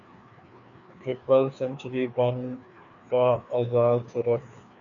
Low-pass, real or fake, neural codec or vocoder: 7.2 kHz; fake; codec, 16 kHz, 2 kbps, FreqCodec, larger model